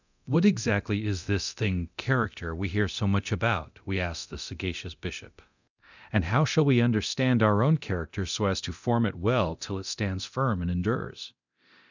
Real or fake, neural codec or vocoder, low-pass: fake; codec, 24 kHz, 0.9 kbps, DualCodec; 7.2 kHz